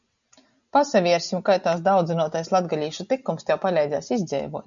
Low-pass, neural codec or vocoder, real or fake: 7.2 kHz; none; real